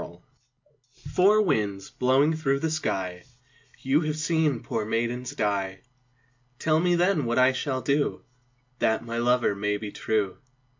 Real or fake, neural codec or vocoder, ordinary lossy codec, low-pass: real; none; MP3, 64 kbps; 7.2 kHz